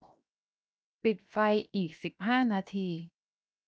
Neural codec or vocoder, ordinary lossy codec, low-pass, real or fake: codec, 16 kHz, 0.7 kbps, FocalCodec; none; none; fake